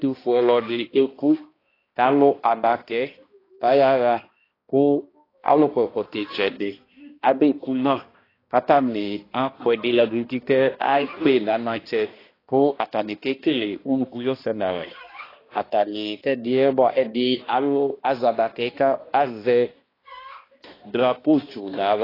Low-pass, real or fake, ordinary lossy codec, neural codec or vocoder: 5.4 kHz; fake; AAC, 24 kbps; codec, 16 kHz, 1 kbps, X-Codec, HuBERT features, trained on balanced general audio